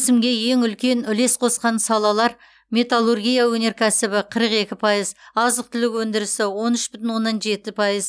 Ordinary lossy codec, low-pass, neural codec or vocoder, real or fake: none; none; none; real